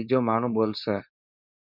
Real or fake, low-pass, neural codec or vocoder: fake; 5.4 kHz; codec, 16 kHz, 4.8 kbps, FACodec